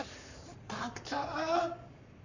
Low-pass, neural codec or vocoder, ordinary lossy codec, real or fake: 7.2 kHz; codec, 44.1 kHz, 7.8 kbps, Pupu-Codec; none; fake